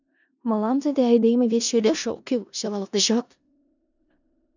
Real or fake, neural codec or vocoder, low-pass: fake; codec, 16 kHz in and 24 kHz out, 0.4 kbps, LongCat-Audio-Codec, four codebook decoder; 7.2 kHz